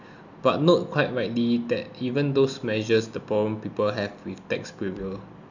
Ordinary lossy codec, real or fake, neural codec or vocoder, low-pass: none; real; none; 7.2 kHz